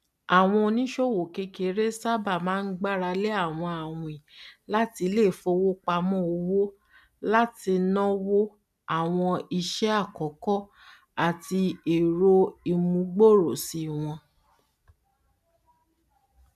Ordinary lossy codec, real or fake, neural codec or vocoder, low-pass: none; real; none; 14.4 kHz